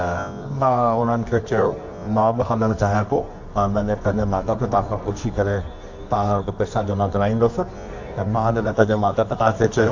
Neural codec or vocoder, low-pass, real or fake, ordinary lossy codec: codec, 24 kHz, 0.9 kbps, WavTokenizer, medium music audio release; 7.2 kHz; fake; AAC, 48 kbps